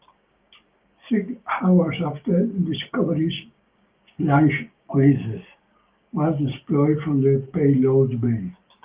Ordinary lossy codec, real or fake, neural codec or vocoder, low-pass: Opus, 32 kbps; fake; codec, 16 kHz, 6 kbps, DAC; 3.6 kHz